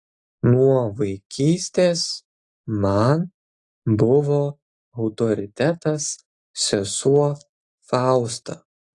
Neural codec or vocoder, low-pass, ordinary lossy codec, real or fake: none; 10.8 kHz; AAC, 48 kbps; real